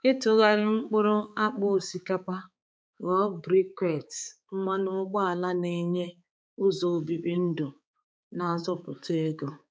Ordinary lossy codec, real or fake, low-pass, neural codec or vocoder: none; fake; none; codec, 16 kHz, 4 kbps, X-Codec, HuBERT features, trained on balanced general audio